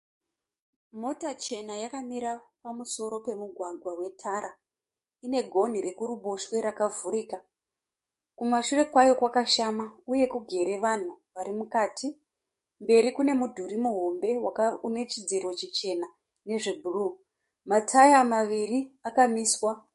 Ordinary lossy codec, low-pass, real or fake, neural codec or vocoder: MP3, 48 kbps; 14.4 kHz; fake; codec, 44.1 kHz, 7.8 kbps, DAC